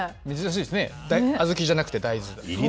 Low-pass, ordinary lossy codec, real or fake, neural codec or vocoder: none; none; real; none